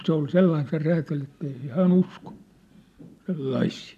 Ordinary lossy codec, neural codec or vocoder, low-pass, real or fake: none; none; 14.4 kHz; real